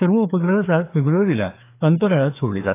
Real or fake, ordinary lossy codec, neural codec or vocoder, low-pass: fake; AAC, 24 kbps; codec, 16 kHz, 2 kbps, FreqCodec, larger model; 3.6 kHz